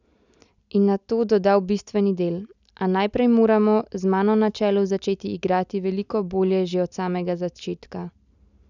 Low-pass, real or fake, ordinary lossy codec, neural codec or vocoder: 7.2 kHz; real; none; none